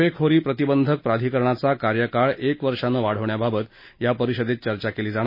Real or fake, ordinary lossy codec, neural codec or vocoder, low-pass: real; MP3, 24 kbps; none; 5.4 kHz